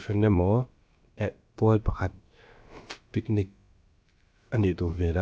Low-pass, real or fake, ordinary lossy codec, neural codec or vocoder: none; fake; none; codec, 16 kHz, about 1 kbps, DyCAST, with the encoder's durations